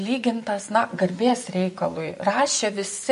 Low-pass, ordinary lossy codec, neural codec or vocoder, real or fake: 10.8 kHz; MP3, 48 kbps; vocoder, 24 kHz, 100 mel bands, Vocos; fake